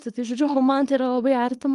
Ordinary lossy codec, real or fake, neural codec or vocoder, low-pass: Opus, 32 kbps; fake; codec, 24 kHz, 0.9 kbps, WavTokenizer, small release; 10.8 kHz